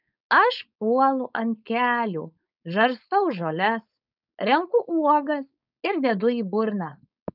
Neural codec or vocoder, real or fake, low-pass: codec, 16 kHz, 4.8 kbps, FACodec; fake; 5.4 kHz